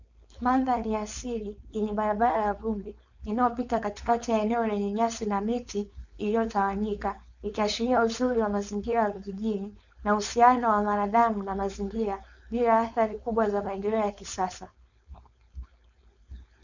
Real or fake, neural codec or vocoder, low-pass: fake; codec, 16 kHz, 4.8 kbps, FACodec; 7.2 kHz